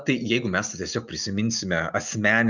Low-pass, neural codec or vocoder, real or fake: 7.2 kHz; none; real